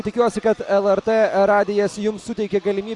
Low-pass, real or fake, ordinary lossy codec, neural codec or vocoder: 10.8 kHz; real; AAC, 64 kbps; none